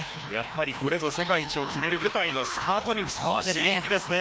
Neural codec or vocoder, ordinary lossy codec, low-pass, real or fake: codec, 16 kHz, 1 kbps, FreqCodec, larger model; none; none; fake